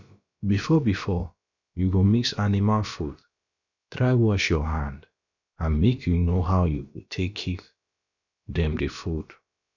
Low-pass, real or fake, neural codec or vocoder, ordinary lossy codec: 7.2 kHz; fake; codec, 16 kHz, about 1 kbps, DyCAST, with the encoder's durations; none